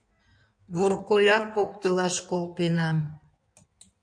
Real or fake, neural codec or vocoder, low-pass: fake; codec, 16 kHz in and 24 kHz out, 1.1 kbps, FireRedTTS-2 codec; 9.9 kHz